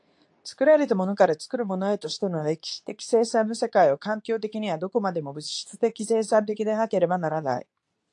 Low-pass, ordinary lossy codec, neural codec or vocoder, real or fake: 10.8 kHz; AAC, 64 kbps; codec, 24 kHz, 0.9 kbps, WavTokenizer, medium speech release version 2; fake